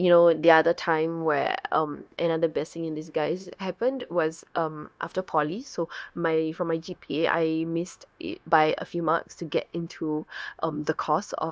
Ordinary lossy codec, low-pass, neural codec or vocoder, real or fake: none; none; codec, 16 kHz, 0.9 kbps, LongCat-Audio-Codec; fake